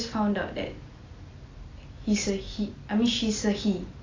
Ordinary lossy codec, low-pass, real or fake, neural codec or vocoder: AAC, 32 kbps; 7.2 kHz; real; none